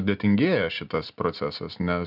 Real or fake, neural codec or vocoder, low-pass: real; none; 5.4 kHz